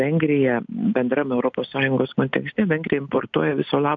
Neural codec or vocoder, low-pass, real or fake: none; 7.2 kHz; real